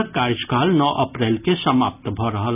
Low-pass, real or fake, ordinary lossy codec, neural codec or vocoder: 3.6 kHz; real; none; none